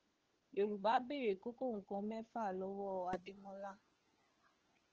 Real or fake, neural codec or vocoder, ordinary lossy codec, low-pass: fake; codec, 16 kHz, 4 kbps, FunCodec, trained on LibriTTS, 50 frames a second; Opus, 16 kbps; 7.2 kHz